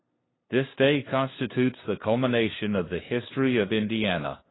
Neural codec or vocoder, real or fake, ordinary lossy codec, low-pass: codec, 16 kHz, 2 kbps, FunCodec, trained on LibriTTS, 25 frames a second; fake; AAC, 16 kbps; 7.2 kHz